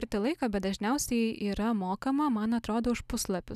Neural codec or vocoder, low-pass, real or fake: none; 14.4 kHz; real